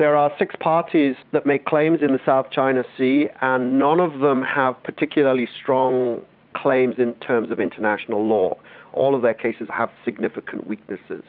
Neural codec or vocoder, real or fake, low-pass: vocoder, 44.1 kHz, 80 mel bands, Vocos; fake; 5.4 kHz